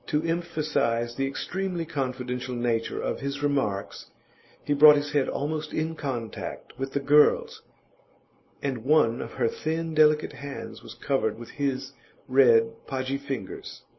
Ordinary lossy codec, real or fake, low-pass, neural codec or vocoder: MP3, 24 kbps; real; 7.2 kHz; none